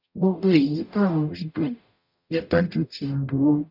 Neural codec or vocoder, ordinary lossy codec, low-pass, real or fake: codec, 44.1 kHz, 0.9 kbps, DAC; none; 5.4 kHz; fake